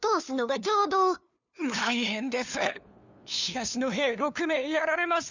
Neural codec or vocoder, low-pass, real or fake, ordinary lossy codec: codec, 16 kHz, 2 kbps, FunCodec, trained on LibriTTS, 25 frames a second; 7.2 kHz; fake; none